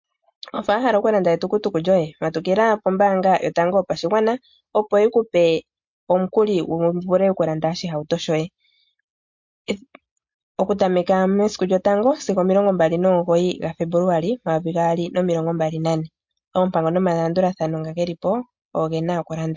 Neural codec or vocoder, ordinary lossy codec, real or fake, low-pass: none; MP3, 48 kbps; real; 7.2 kHz